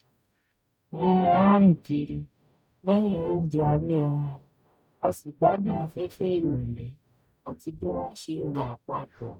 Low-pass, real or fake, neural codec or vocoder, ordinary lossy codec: 19.8 kHz; fake; codec, 44.1 kHz, 0.9 kbps, DAC; none